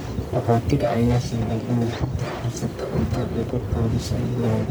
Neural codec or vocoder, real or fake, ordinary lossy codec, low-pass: codec, 44.1 kHz, 1.7 kbps, Pupu-Codec; fake; none; none